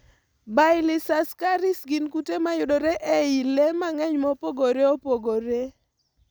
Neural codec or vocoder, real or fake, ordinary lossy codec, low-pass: none; real; none; none